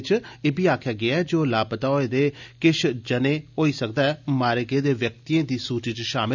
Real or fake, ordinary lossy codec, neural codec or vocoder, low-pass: real; none; none; 7.2 kHz